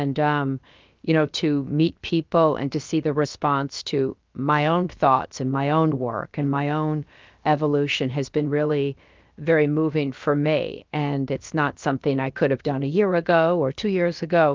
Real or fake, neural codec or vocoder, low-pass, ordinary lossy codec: fake; codec, 16 kHz, about 1 kbps, DyCAST, with the encoder's durations; 7.2 kHz; Opus, 24 kbps